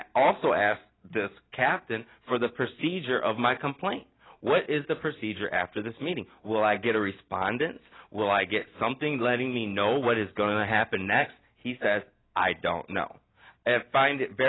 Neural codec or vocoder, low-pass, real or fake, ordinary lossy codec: none; 7.2 kHz; real; AAC, 16 kbps